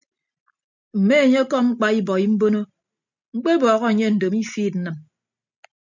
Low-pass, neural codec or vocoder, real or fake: 7.2 kHz; none; real